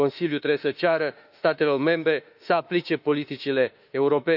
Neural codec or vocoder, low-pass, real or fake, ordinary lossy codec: autoencoder, 48 kHz, 32 numbers a frame, DAC-VAE, trained on Japanese speech; 5.4 kHz; fake; none